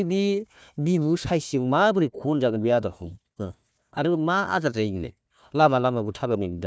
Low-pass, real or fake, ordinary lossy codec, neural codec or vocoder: none; fake; none; codec, 16 kHz, 1 kbps, FunCodec, trained on Chinese and English, 50 frames a second